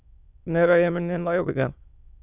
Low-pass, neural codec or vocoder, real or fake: 3.6 kHz; autoencoder, 22.05 kHz, a latent of 192 numbers a frame, VITS, trained on many speakers; fake